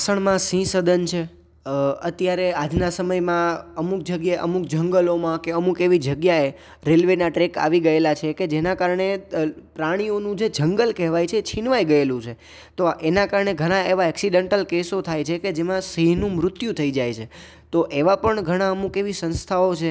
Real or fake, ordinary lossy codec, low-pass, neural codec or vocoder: real; none; none; none